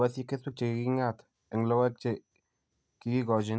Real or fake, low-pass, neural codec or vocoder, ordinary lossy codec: real; none; none; none